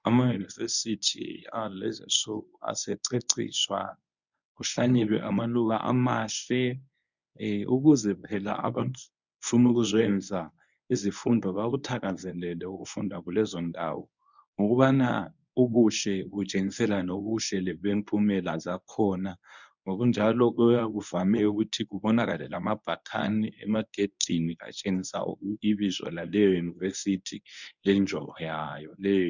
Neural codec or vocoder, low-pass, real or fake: codec, 24 kHz, 0.9 kbps, WavTokenizer, medium speech release version 1; 7.2 kHz; fake